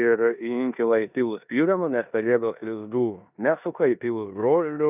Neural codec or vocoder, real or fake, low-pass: codec, 16 kHz in and 24 kHz out, 0.9 kbps, LongCat-Audio-Codec, four codebook decoder; fake; 3.6 kHz